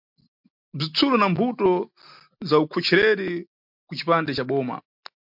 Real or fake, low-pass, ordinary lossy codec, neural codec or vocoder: real; 5.4 kHz; MP3, 48 kbps; none